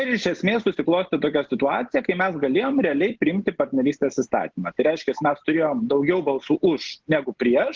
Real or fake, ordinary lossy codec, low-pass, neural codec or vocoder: real; Opus, 16 kbps; 7.2 kHz; none